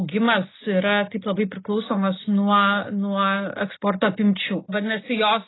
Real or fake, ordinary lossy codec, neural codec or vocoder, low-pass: real; AAC, 16 kbps; none; 7.2 kHz